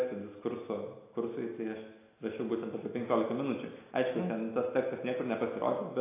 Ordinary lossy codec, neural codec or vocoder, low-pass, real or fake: AAC, 24 kbps; none; 3.6 kHz; real